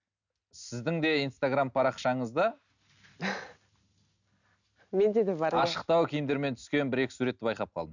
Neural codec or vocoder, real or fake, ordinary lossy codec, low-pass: none; real; none; 7.2 kHz